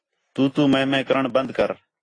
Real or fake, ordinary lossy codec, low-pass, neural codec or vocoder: real; AAC, 32 kbps; 9.9 kHz; none